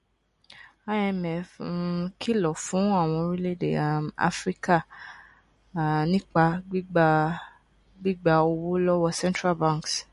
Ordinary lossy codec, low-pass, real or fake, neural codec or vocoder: MP3, 48 kbps; 14.4 kHz; real; none